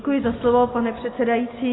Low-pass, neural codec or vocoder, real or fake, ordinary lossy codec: 7.2 kHz; none; real; AAC, 16 kbps